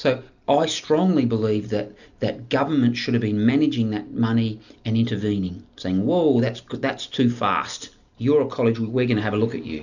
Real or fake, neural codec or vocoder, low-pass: real; none; 7.2 kHz